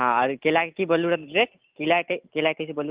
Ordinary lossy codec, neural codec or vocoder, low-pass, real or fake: Opus, 24 kbps; none; 3.6 kHz; real